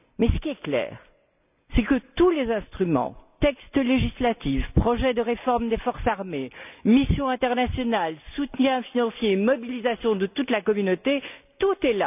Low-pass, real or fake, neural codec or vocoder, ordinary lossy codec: 3.6 kHz; real; none; none